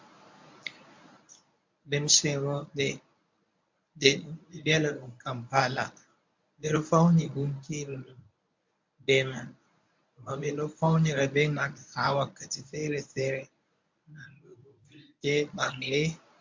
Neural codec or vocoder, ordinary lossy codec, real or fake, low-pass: codec, 24 kHz, 0.9 kbps, WavTokenizer, medium speech release version 1; MP3, 64 kbps; fake; 7.2 kHz